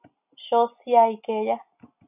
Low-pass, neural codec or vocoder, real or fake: 3.6 kHz; none; real